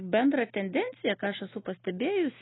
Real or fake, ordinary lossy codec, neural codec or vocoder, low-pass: real; AAC, 16 kbps; none; 7.2 kHz